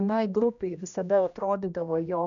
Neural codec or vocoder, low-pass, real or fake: codec, 16 kHz, 1 kbps, X-Codec, HuBERT features, trained on general audio; 7.2 kHz; fake